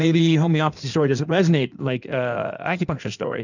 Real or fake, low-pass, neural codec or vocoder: fake; 7.2 kHz; codec, 16 kHz in and 24 kHz out, 1.1 kbps, FireRedTTS-2 codec